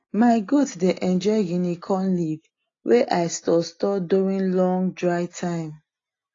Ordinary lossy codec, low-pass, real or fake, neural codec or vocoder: AAC, 32 kbps; 7.2 kHz; real; none